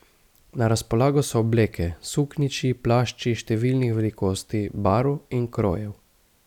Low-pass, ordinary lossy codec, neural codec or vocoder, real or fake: 19.8 kHz; none; none; real